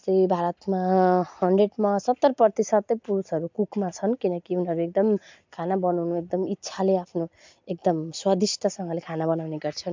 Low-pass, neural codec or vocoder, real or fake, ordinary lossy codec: 7.2 kHz; none; real; MP3, 64 kbps